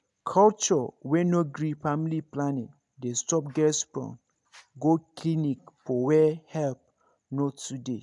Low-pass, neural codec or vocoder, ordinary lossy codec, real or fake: 10.8 kHz; none; none; real